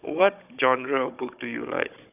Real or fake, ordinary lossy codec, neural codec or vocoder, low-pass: fake; none; codec, 16 kHz, 16 kbps, FunCodec, trained on Chinese and English, 50 frames a second; 3.6 kHz